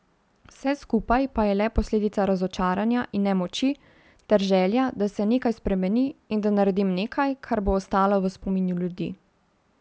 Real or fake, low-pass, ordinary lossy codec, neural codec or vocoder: real; none; none; none